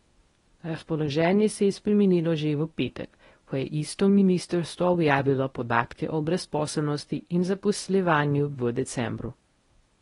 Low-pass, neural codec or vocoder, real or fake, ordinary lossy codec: 10.8 kHz; codec, 24 kHz, 0.9 kbps, WavTokenizer, medium speech release version 1; fake; AAC, 32 kbps